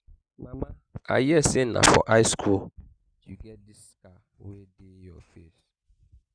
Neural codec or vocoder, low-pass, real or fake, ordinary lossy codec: none; 9.9 kHz; real; Opus, 64 kbps